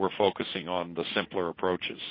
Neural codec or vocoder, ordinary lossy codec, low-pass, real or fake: vocoder, 44.1 kHz, 80 mel bands, Vocos; MP3, 24 kbps; 5.4 kHz; fake